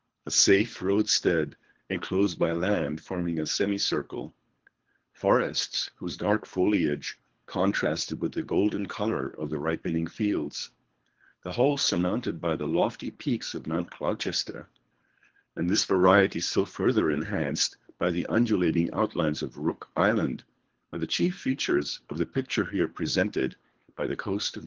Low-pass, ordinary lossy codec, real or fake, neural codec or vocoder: 7.2 kHz; Opus, 16 kbps; fake; codec, 24 kHz, 3 kbps, HILCodec